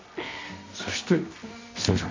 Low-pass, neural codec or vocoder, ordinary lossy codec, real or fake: 7.2 kHz; codec, 24 kHz, 0.9 kbps, WavTokenizer, medium music audio release; MP3, 64 kbps; fake